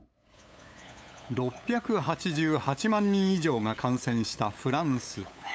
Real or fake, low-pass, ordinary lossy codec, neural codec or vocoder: fake; none; none; codec, 16 kHz, 8 kbps, FunCodec, trained on LibriTTS, 25 frames a second